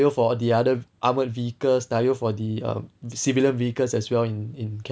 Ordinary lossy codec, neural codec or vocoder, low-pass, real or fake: none; none; none; real